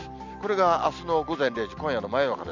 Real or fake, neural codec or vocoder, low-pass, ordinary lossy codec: real; none; 7.2 kHz; none